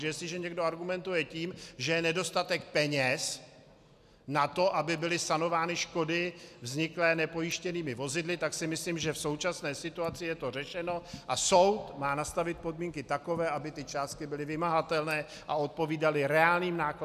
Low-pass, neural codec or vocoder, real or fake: 14.4 kHz; none; real